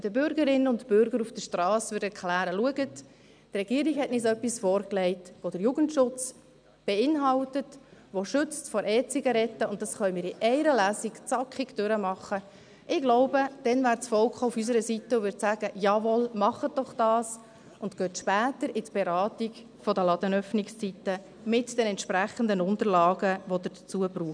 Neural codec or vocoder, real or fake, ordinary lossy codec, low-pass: none; real; none; 9.9 kHz